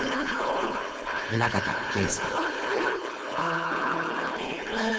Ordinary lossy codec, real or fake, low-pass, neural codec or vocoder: none; fake; none; codec, 16 kHz, 4.8 kbps, FACodec